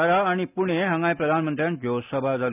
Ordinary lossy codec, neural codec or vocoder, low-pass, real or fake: none; none; 3.6 kHz; real